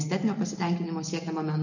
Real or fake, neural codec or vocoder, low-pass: real; none; 7.2 kHz